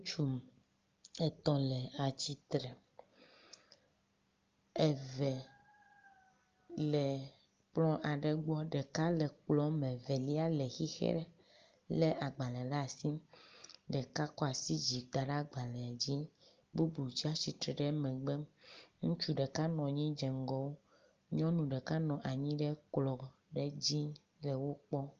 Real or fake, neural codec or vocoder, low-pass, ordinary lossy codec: real; none; 7.2 kHz; Opus, 24 kbps